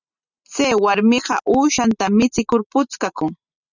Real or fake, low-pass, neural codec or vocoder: real; 7.2 kHz; none